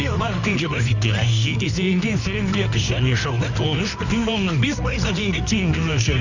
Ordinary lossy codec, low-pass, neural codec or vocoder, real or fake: none; 7.2 kHz; codec, 24 kHz, 0.9 kbps, WavTokenizer, medium music audio release; fake